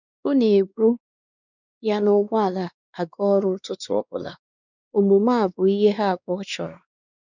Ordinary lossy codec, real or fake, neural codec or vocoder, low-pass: none; fake; codec, 16 kHz, 2 kbps, X-Codec, WavLM features, trained on Multilingual LibriSpeech; 7.2 kHz